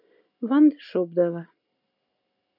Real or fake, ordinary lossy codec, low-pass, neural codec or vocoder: real; MP3, 48 kbps; 5.4 kHz; none